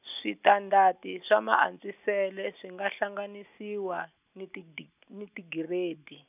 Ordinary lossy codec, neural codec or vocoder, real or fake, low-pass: none; none; real; 3.6 kHz